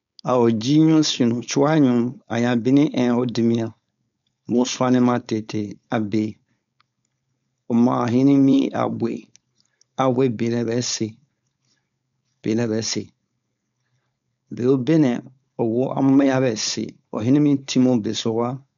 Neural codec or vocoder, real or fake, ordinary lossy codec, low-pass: codec, 16 kHz, 4.8 kbps, FACodec; fake; none; 7.2 kHz